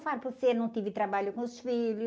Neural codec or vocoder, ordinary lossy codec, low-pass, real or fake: none; none; none; real